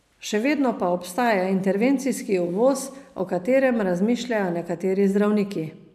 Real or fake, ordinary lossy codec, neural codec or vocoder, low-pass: real; none; none; 14.4 kHz